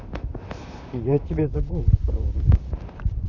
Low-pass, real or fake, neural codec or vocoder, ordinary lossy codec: 7.2 kHz; fake; vocoder, 44.1 kHz, 128 mel bands, Pupu-Vocoder; none